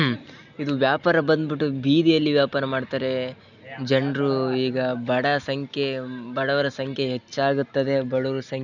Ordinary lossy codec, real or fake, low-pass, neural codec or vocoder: none; real; 7.2 kHz; none